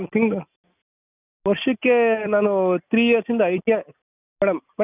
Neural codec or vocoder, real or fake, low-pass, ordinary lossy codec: none; real; 3.6 kHz; none